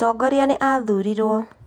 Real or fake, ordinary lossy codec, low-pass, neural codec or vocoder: fake; none; 19.8 kHz; vocoder, 48 kHz, 128 mel bands, Vocos